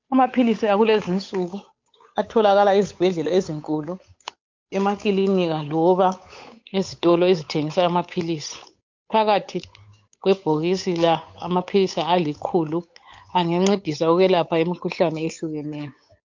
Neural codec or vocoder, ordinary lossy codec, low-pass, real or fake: codec, 16 kHz, 8 kbps, FunCodec, trained on Chinese and English, 25 frames a second; MP3, 48 kbps; 7.2 kHz; fake